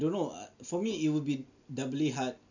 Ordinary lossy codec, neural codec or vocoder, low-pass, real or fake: none; none; 7.2 kHz; real